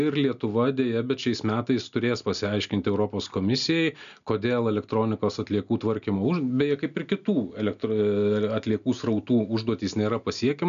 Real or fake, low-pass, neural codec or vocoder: real; 7.2 kHz; none